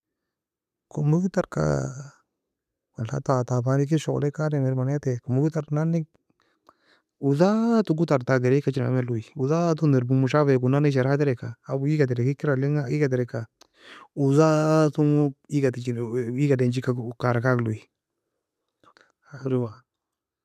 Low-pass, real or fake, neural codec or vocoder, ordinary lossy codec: 14.4 kHz; real; none; none